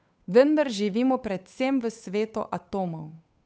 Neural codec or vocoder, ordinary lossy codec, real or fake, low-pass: codec, 16 kHz, 8 kbps, FunCodec, trained on Chinese and English, 25 frames a second; none; fake; none